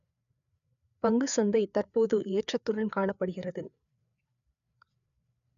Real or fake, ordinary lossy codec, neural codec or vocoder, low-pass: fake; none; codec, 16 kHz, 4 kbps, FreqCodec, larger model; 7.2 kHz